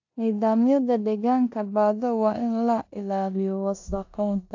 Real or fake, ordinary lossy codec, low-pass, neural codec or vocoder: fake; AAC, 48 kbps; 7.2 kHz; codec, 16 kHz in and 24 kHz out, 0.9 kbps, LongCat-Audio-Codec, four codebook decoder